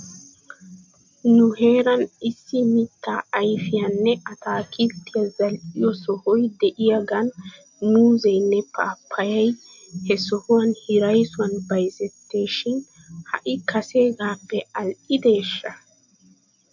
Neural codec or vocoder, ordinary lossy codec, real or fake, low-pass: none; MP3, 48 kbps; real; 7.2 kHz